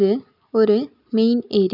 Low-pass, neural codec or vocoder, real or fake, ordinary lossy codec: 5.4 kHz; none; real; none